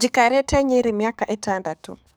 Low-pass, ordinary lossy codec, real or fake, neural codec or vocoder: none; none; fake; codec, 44.1 kHz, 7.8 kbps, DAC